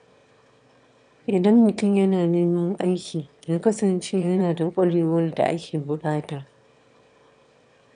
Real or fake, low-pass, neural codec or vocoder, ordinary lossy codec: fake; 9.9 kHz; autoencoder, 22.05 kHz, a latent of 192 numbers a frame, VITS, trained on one speaker; none